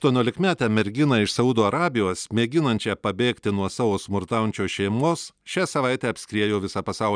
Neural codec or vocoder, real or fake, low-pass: none; real; 9.9 kHz